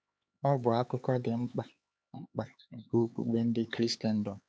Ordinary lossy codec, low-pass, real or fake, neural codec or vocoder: none; none; fake; codec, 16 kHz, 4 kbps, X-Codec, HuBERT features, trained on LibriSpeech